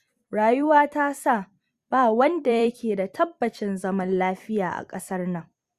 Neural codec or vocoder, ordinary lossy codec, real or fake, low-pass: vocoder, 44.1 kHz, 128 mel bands every 256 samples, BigVGAN v2; Opus, 64 kbps; fake; 14.4 kHz